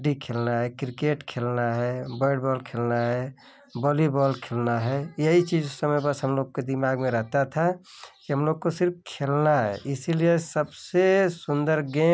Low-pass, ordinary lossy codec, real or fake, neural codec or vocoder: none; none; real; none